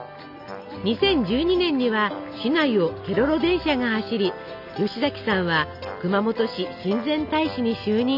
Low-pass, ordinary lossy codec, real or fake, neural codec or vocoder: 5.4 kHz; none; real; none